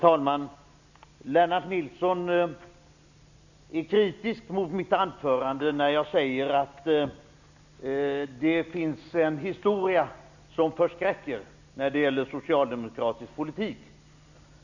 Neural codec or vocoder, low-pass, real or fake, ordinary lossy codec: none; 7.2 kHz; real; none